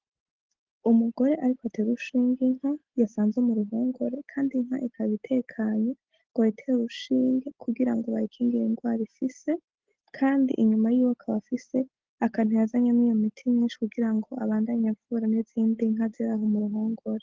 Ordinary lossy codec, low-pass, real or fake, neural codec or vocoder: Opus, 16 kbps; 7.2 kHz; real; none